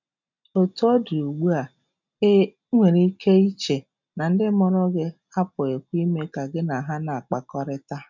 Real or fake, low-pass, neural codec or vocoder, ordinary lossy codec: real; 7.2 kHz; none; none